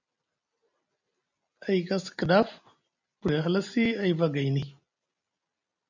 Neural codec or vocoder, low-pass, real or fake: none; 7.2 kHz; real